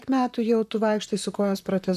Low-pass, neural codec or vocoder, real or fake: 14.4 kHz; vocoder, 44.1 kHz, 128 mel bands, Pupu-Vocoder; fake